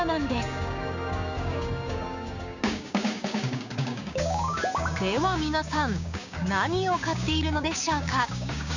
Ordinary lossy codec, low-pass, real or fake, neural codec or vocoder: none; 7.2 kHz; fake; codec, 16 kHz, 6 kbps, DAC